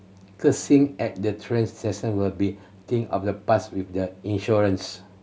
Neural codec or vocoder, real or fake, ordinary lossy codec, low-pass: none; real; none; none